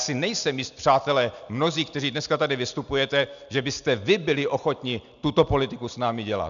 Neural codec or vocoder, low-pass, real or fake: none; 7.2 kHz; real